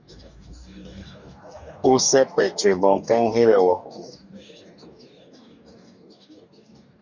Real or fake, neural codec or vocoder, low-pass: fake; codec, 44.1 kHz, 2.6 kbps, DAC; 7.2 kHz